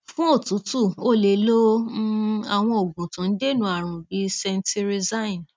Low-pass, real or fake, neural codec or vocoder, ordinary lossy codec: none; real; none; none